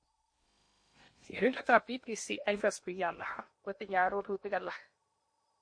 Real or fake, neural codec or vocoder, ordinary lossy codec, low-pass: fake; codec, 16 kHz in and 24 kHz out, 0.8 kbps, FocalCodec, streaming, 65536 codes; MP3, 48 kbps; 9.9 kHz